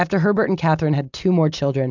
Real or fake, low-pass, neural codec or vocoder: real; 7.2 kHz; none